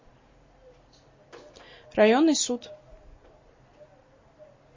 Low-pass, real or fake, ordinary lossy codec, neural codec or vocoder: 7.2 kHz; real; MP3, 32 kbps; none